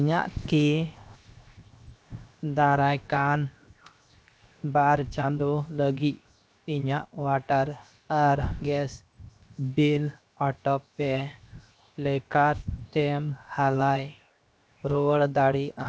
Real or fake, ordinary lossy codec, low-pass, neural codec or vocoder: fake; none; none; codec, 16 kHz, 0.7 kbps, FocalCodec